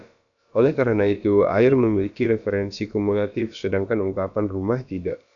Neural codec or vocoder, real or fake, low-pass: codec, 16 kHz, about 1 kbps, DyCAST, with the encoder's durations; fake; 7.2 kHz